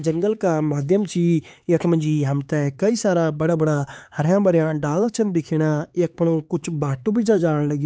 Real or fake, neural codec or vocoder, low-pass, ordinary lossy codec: fake; codec, 16 kHz, 4 kbps, X-Codec, HuBERT features, trained on LibriSpeech; none; none